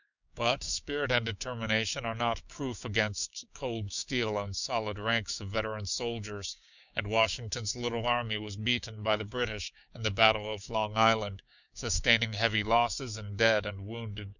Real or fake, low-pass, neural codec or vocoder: fake; 7.2 kHz; codec, 16 kHz, 6 kbps, DAC